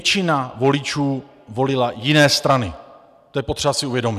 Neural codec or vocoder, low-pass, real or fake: none; 14.4 kHz; real